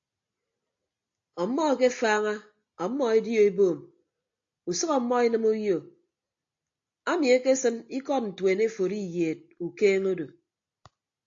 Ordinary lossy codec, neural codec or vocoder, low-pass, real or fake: AAC, 48 kbps; none; 7.2 kHz; real